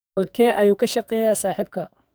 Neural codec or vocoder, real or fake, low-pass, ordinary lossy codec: codec, 44.1 kHz, 2.6 kbps, SNAC; fake; none; none